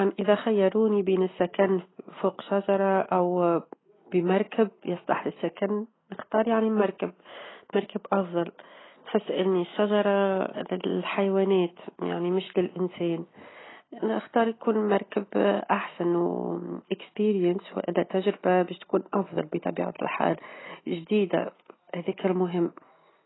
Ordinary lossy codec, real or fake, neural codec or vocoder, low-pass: AAC, 16 kbps; real; none; 7.2 kHz